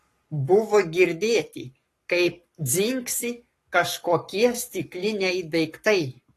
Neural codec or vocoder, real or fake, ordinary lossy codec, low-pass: codec, 44.1 kHz, 7.8 kbps, Pupu-Codec; fake; AAC, 48 kbps; 14.4 kHz